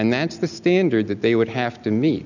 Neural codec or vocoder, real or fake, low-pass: none; real; 7.2 kHz